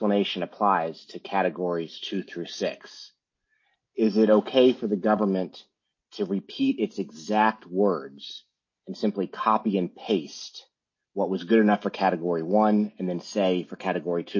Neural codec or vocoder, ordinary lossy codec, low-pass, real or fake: none; MP3, 32 kbps; 7.2 kHz; real